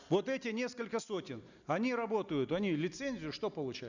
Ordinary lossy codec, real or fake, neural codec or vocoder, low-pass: none; real; none; 7.2 kHz